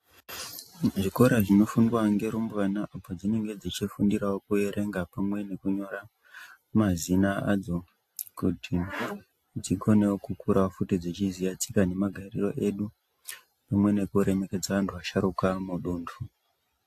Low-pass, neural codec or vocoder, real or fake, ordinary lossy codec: 14.4 kHz; none; real; AAC, 64 kbps